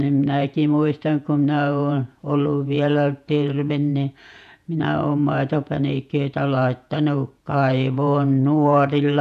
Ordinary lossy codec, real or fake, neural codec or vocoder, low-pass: none; fake; vocoder, 48 kHz, 128 mel bands, Vocos; 14.4 kHz